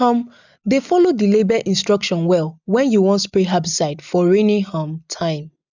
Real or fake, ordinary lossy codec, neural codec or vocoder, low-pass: real; none; none; 7.2 kHz